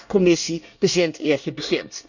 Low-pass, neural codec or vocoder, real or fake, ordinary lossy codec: 7.2 kHz; codec, 24 kHz, 1 kbps, SNAC; fake; none